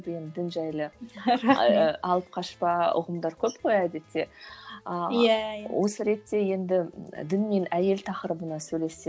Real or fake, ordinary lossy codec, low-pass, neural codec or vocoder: real; none; none; none